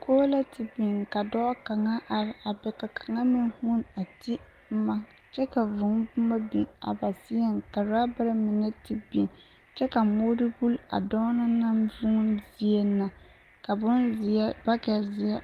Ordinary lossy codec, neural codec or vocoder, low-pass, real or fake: Opus, 24 kbps; none; 14.4 kHz; real